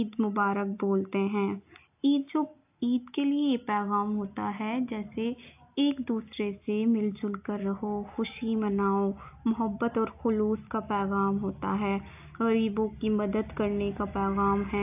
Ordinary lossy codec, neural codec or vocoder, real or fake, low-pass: AAC, 32 kbps; none; real; 3.6 kHz